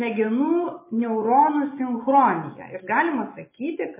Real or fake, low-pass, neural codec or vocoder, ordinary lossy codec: real; 3.6 kHz; none; MP3, 16 kbps